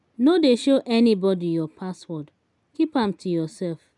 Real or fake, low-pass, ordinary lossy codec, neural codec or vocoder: real; 10.8 kHz; none; none